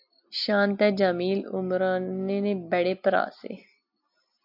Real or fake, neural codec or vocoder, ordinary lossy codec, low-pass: real; none; AAC, 48 kbps; 5.4 kHz